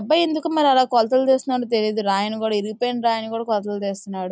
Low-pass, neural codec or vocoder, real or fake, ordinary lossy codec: none; none; real; none